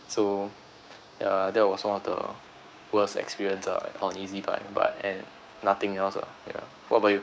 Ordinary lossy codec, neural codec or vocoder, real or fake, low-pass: none; codec, 16 kHz, 6 kbps, DAC; fake; none